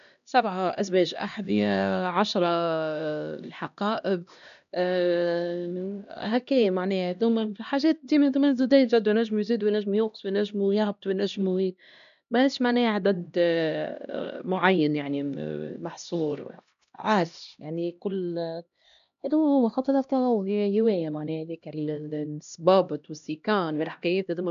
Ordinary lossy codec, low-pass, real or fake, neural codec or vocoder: none; 7.2 kHz; fake; codec, 16 kHz, 1 kbps, X-Codec, HuBERT features, trained on LibriSpeech